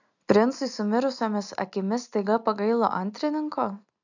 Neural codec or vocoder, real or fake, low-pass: none; real; 7.2 kHz